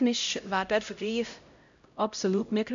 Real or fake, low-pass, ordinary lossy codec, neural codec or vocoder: fake; 7.2 kHz; MP3, 64 kbps; codec, 16 kHz, 0.5 kbps, X-Codec, HuBERT features, trained on LibriSpeech